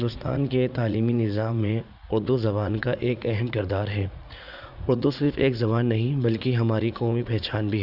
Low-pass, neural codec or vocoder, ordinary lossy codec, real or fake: 5.4 kHz; vocoder, 44.1 kHz, 80 mel bands, Vocos; none; fake